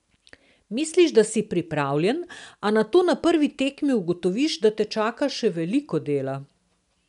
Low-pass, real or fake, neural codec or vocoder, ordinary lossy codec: 10.8 kHz; real; none; none